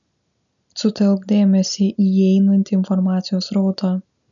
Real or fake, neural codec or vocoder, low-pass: real; none; 7.2 kHz